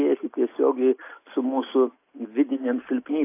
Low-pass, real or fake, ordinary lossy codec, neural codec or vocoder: 3.6 kHz; real; AAC, 24 kbps; none